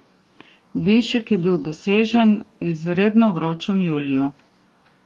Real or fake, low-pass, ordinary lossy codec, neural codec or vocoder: fake; 19.8 kHz; Opus, 32 kbps; codec, 44.1 kHz, 2.6 kbps, DAC